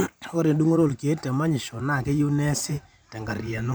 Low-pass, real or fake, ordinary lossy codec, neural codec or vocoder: none; fake; none; vocoder, 44.1 kHz, 128 mel bands every 512 samples, BigVGAN v2